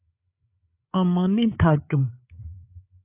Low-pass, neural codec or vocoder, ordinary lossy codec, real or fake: 3.6 kHz; codec, 16 kHz, 8 kbps, FreqCodec, larger model; AAC, 24 kbps; fake